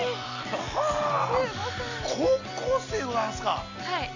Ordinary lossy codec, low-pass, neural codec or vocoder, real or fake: none; 7.2 kHz; none; real